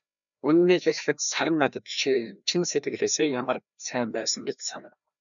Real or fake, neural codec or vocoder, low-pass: fake; codec, 16 kHz, 1 kbps, FreqCodec, larger model; 7.2 kHz